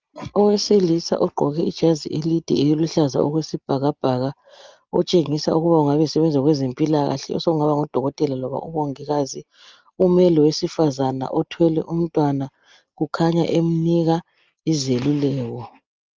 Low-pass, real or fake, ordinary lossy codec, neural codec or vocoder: 7.2 kHz; real; Opus, 24 kbps; none